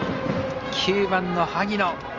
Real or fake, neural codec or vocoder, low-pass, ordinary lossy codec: real; none; 7.2 kHz; Opus, 32 kbps